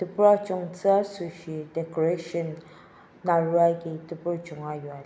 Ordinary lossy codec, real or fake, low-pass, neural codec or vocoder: none; real; none; none